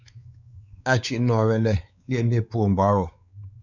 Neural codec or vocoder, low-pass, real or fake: codec, 16 kHz, 4 kbps, X-Codec, WavLM features, trained on Multilingual LibriSpeech; 7.2 kHz; fake